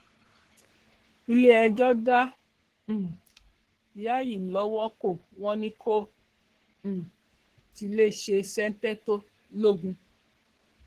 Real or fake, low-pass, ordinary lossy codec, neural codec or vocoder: fake; 14.4 kHz; Opus, 16 kbps; codec, 44.1 kHz, 3.4 kbps, Pupu-Codec